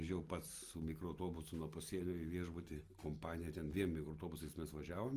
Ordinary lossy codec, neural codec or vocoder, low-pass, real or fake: Opus, 24 kbps; none; 14.4 kHz; real